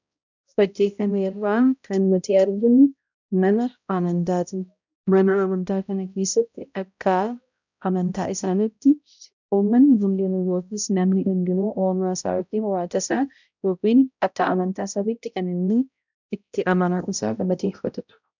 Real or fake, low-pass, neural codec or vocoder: fake; 7.2 kHz; codec, 16 kHz, 0.5 kbps, X-Codec, HuBERT features, trained on balanced general audio